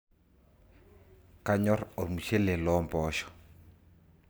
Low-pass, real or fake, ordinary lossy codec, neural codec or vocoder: none; real; none; none